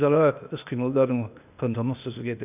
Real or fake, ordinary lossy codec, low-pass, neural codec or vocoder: fake; none; 3.6 kHz; codec, 16 kHz, 0.8 kbps, ZipCodec